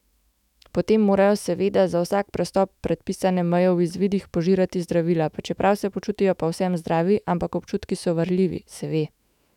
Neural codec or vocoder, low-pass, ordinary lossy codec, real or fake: autoencoder, 48 kHz, 128 numbers a frame, DAC-VAE, trained on Japanese speech; 19.8 kHz; none; fake